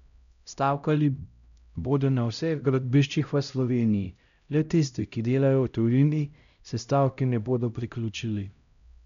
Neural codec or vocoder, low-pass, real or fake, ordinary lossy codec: codec, 16 kHz, 0.5 kbps, X-Codec, HuBERT features, trained on LibriSpeech; 7.2 kHz; fake; none